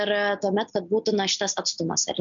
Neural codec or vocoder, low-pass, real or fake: none; 7.2 kHz; real